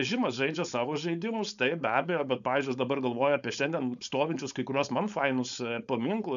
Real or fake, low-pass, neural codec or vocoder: fake; 7.2 kHz; codec, 16 kHz, 4.8 kbps, FACodec